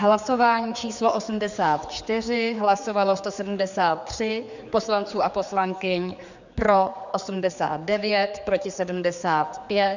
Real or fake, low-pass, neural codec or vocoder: fake; 7.2 kHz; codec, 16 kHz, 4 kbps, X-Codec, HuBERT features, trained on general audio